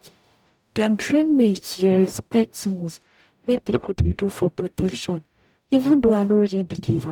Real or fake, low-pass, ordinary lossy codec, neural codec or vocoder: fake; 19.8 kHz; none; codec, 44.1 kHz, 0.9 kbps, DAC